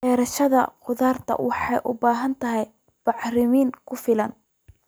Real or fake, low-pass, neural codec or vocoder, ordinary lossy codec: real; none; none; none